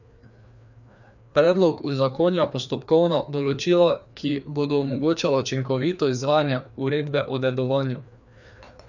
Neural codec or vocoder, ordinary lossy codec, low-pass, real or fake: codec, 16 kHz, 2 kbps, FreqCodec, larger model; none; 7.2 kHz; fake